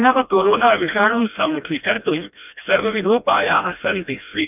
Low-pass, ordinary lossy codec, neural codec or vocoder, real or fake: 3.6 kHz; none; codec, 16 kHz, 1 kbps, FreqCodec, smaller model; fake